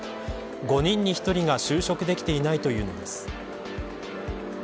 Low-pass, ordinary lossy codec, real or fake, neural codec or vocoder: none; none; real; none